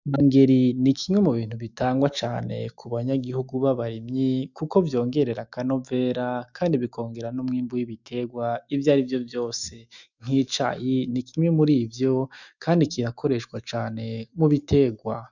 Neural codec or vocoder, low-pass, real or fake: autoencoder, 48 kHz, 128 numbers a frame, DAC-VAE, trained on Japanese speech; 7.2 kHz; fake